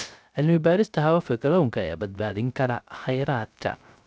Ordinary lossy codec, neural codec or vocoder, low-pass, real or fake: none; codec, 16 kHz, 0.3 kbps, FocalCodec; none; fake